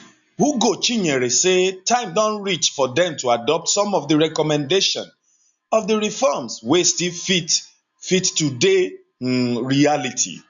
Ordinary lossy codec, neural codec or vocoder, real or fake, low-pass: none; none; real; 7.2 kHz